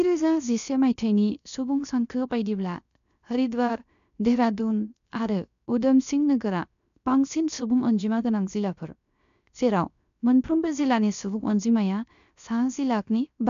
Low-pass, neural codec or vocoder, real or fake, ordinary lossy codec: 7.2 kHz; codec, 16 kHz, 0.7 kbps, FocalCodec; fake; none